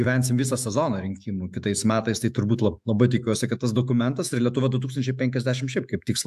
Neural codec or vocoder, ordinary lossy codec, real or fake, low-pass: autoencoder, 48 kHz, 128 numbers a frame, DAC-VAE, trained on Japanese speech; AAC, 96 kbps; fake; 14.4 kHz